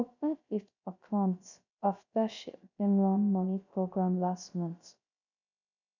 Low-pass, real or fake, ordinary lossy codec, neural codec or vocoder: 7.2 kHz; fake; none; codec, 16 kHz, 0.3 kbps, FocalCodec